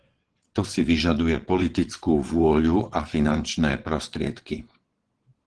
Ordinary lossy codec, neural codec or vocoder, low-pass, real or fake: Opus, 16 kbps; vocoder, 22.05 kHz, 80 mel bands, WaveNeXt; 9.9 kHz; fake